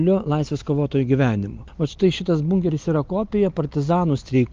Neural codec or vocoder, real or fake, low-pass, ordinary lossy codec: none; real; 7.2 kHz; Opus, 24 kbps